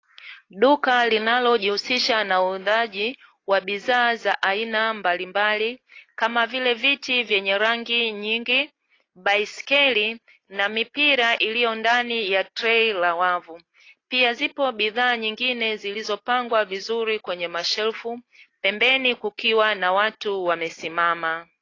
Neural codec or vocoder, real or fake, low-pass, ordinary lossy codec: none; real; 7.2 kHz; AAC, 32 kbps